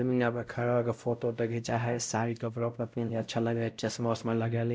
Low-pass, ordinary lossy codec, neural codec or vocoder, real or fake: none; none; codec, 16 kHz, 0.5 kbps, X-Codec, WavLM features, trained on Multilingual LibriSpeech; fake